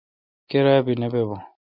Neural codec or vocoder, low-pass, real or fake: none; 5.4 kHz; real